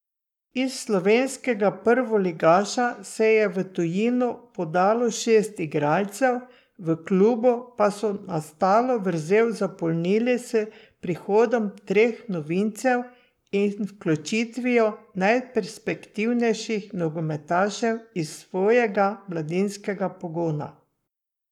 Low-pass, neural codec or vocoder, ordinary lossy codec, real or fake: 19.8 kHz; codec, 44.1 kHz, 7.8 kbps, Pupu-Codec; none; fake